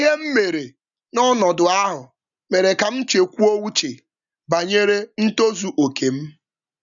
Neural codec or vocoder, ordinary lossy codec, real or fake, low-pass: none; none; real; 7.2 kHz